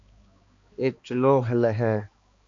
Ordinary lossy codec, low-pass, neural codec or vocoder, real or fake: AAC, 48 kbps; 7.2 kHz; codec, 16 kHz, 2 kbps, X-Codec, HuBERT features, trained on balanced general audio; fake